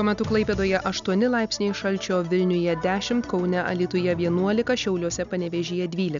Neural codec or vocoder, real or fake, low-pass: none; real; 7.2 kHz